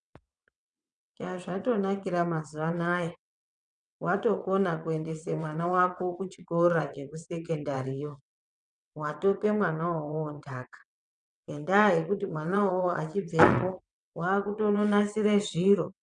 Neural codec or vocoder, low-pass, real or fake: vocoder, 22.05 kHz, 80 mel bands, WaveNeXt; 9.9 kHz; fake